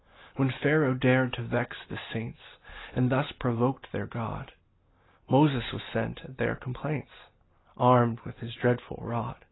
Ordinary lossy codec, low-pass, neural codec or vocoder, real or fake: AAC, 16 kbps; 7.2 kHz; none; real